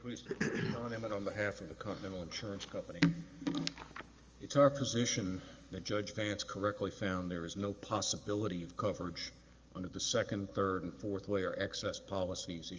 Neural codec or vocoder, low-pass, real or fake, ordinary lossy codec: codec, 44.1 kHz, 7.8 kbps, DAC; 7.2 kHz; fake; Opus, 32 kbps